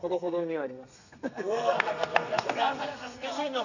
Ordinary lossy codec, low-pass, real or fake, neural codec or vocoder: none; 7.2 kHz; fake; codec, 32 kHz, 1.9 kbps, SNAC